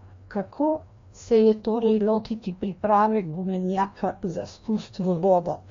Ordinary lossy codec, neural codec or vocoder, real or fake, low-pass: MP3, 48 kbps; codec, 16 kHz, 1 kbps, FreqCodec, larger model; fake; 7.2 kHz